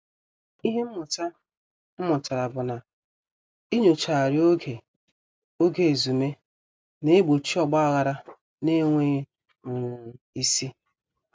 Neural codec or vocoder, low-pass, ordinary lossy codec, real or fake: none; none; none; real